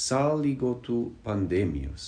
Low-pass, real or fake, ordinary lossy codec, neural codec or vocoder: 9.9 kHz; real; MP3, 96 kbps; none